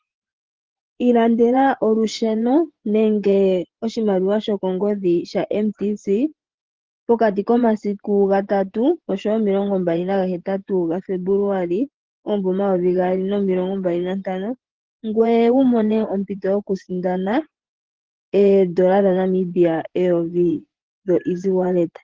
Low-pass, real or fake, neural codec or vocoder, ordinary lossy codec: 7.2 kHz; fake; vocoder, 24 kHz, 100 mel bands, Vocos; Opus, 16 kbps